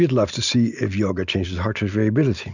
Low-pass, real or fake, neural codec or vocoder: 7.2 kHz; real; none